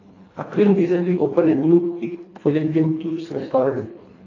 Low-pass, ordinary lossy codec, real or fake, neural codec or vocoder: 7.2 kHz; AAC, 32 kbps; fake; codec, 24 kHz, 1.5 kbps, HILCodec